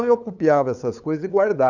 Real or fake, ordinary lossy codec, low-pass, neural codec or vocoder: fake; none; 7.2 kHz; codec, 16 kHz, 8 kbps, FunCodec, trained on LibriTTS, 25 frames a second